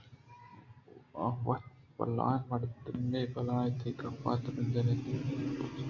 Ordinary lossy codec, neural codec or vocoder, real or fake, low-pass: AAC, 48 kbps; none; real; 7.2 kHz